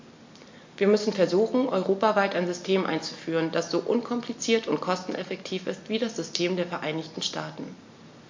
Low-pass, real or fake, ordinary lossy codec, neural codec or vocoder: 7.2 kHz; real; MP3, 48 kbps; none